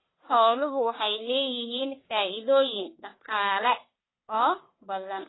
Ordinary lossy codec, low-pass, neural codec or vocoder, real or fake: AAC, 16 kbps; 7.2 kHz; codec, 44.1 kHz, 1.7 kbps, Pupu-Codec; fake